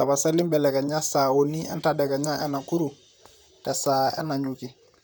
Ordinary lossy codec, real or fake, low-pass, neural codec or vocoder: none; fake; none; vocoder, 44.1 kHz, 128 mel bands, Pupu-Vocoder